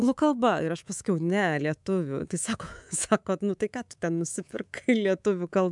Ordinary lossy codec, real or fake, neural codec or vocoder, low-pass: MP3, 96 kbps; fake; codec, 24 kHz, 3.1 kbps, DualCodec; 10.8 kHz